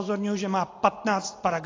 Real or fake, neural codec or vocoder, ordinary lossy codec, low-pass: real; none; AAC, 32 kbps; 7.2 kHz